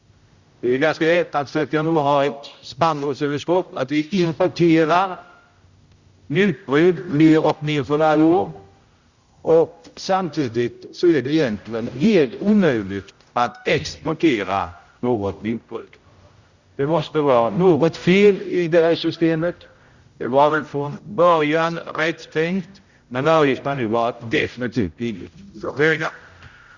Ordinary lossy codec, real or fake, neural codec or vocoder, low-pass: Opus, 64 kbps; fake; codec, 16 kHz, 0.5 kbps, X-Codec, HuBERT features, trained on general audio; 7.2 kHz